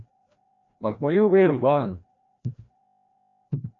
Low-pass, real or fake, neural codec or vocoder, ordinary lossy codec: 7.2 kHz; fake; codec, 16 kHz, 1 kbps, FreqCodec, larger model; MP3, 64 kbps